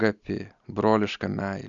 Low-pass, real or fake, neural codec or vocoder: 7.2 kHz; real; none